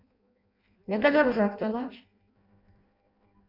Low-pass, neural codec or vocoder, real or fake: 5.4 kHz; codec, 16 kHz in and 24 kHz out, 0.6 kbps, FireRedTTS-2 codec; fake